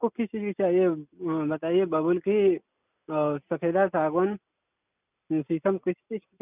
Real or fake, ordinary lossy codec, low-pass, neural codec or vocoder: real; none; 3.6 kHz; none